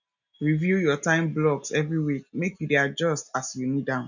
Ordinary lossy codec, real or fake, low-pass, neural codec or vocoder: none; real; 7.2 kHz; none